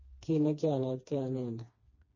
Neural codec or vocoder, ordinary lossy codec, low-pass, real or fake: codec, 16 kHz, 2 kbps, FreqCodec, smaller model; MP3, 32 kbps; 7.2 kHz; fake